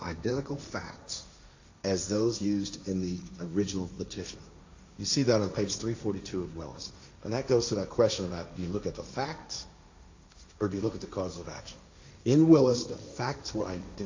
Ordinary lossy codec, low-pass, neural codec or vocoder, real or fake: AAC, 48 kbps; 7.2 kHz; codec, 16 kHz, 1.1 kbps, Voila-Tokenizer; fake